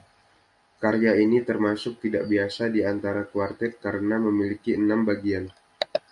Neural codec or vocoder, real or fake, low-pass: none; real; 10.8 kHz